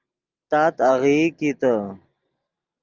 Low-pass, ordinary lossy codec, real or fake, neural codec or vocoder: 7.2 kHz; Opus, 24 kbps; real; none